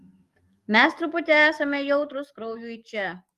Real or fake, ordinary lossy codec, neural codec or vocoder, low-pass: fake; Opus, 32 kbps; codec, 44.1 kHz, 7.8 kbps, DAC; 14.4 kHz